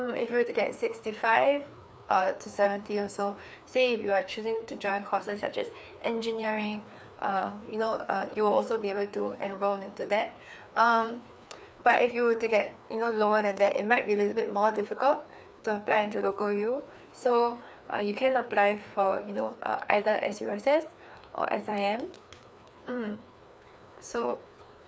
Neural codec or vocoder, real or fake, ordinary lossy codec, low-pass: codec, 16 kHz, 2 kbps, FreqCodec, larger model; fake; none; none